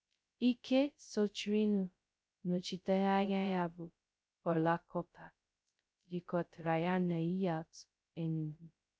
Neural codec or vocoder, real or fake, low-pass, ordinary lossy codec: codec, 16 kHz, 0.2 kbps, FocalCodec; fake; none; none